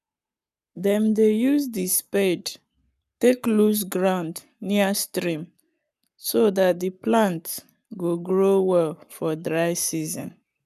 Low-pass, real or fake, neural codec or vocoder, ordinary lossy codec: 14.4 kHz; fake; codec, 44.1 kHz, 7.8 kbps, Pupu-Codec; none